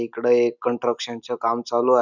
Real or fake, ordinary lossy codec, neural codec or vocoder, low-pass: real; none; none; 7.2 kHz